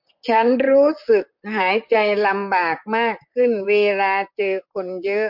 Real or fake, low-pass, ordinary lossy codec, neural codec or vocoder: fake; 5.4 kHz; MP3, 48 kbps; vocoder, 44.1 kHz, 128 mel bands, Pupu-Vocoder